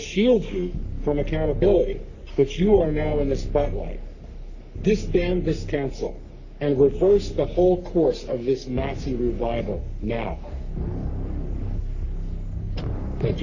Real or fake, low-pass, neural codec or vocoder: fake; 7.2 kHz; codec, 44.1 kHz, 3.4 kbps, Pupu-Codec